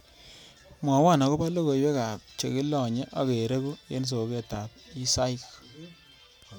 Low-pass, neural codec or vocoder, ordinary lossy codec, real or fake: none; none; none; real